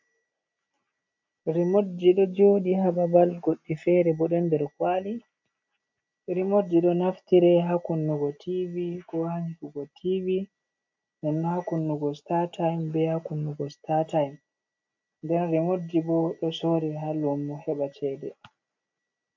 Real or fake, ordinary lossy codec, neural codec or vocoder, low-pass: real; MP3, 64 kbps; none; 7.2 kHz